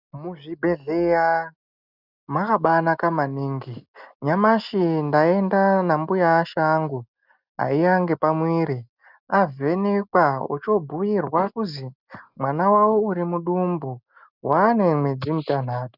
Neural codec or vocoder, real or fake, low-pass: none; real; 5.4 kHz